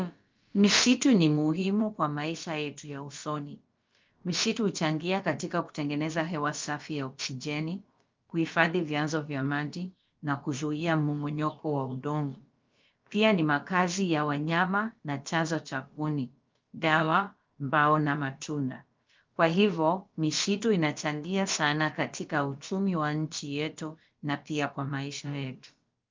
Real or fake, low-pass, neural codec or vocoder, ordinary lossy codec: fake; 7.2 kHz; codec, 16 kHz, about 1 kbps, DyCAST, with the encoder's durations; Opus, 24 kbps